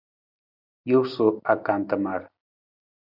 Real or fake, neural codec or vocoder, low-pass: real; none; 5.4 kHz